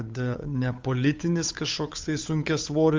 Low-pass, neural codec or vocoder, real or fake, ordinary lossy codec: 7.2 kHz; codec, 16 kHz, 8 kbps, FunCodec, trained on Chinese and English, 25 frames a second; fake; Opus, 32 kbps